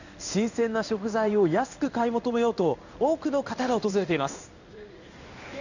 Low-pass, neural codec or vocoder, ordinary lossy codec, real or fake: 7.2 kHz; codec, 16 kHz in and 24 kHz out, 1 kbps, XY-Tokenizer; none; fake